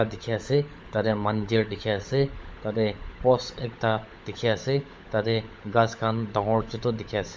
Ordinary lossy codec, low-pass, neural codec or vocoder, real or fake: none; none; codec, 16 kHz, 16 kbps, FunCodec, trained on LibriTTS, 50 frames a second; fake